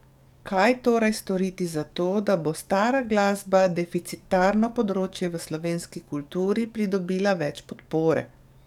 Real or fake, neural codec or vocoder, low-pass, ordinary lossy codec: fake; codec, 44.1 kHz, 7.8 kbps, DAC; 19.8 kHz; none